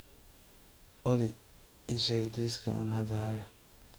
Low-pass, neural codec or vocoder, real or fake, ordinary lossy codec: none; codec, 44.1 kHz, 2.6 kbps, DAC; fake; none